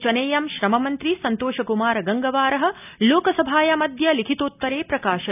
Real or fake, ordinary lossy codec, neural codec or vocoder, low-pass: real; none; none; 3.6 kHz